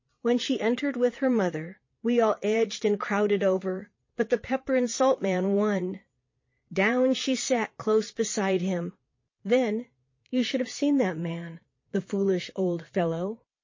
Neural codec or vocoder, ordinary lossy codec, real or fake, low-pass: vocoder, 22.05 kHz, 80 mel bands, Vocos; MP3, 32 kbps; fake; 7.2 kHz